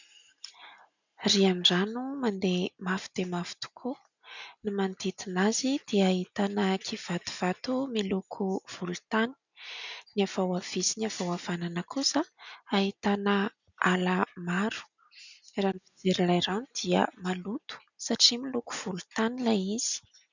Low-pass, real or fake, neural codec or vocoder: 7.2 kHz; real; none